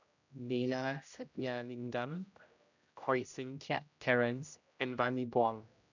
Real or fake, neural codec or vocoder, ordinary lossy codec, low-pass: fake; codec, 16 kHz, 0.5 kbps, X-Codec, HuBERT features, trained on general audio; none; 7.2 kHz